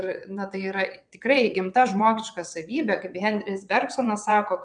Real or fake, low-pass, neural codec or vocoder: fake; 9.9 kHz; vocoder, 22.05 kHz, 80 mel bands, WaveNeXt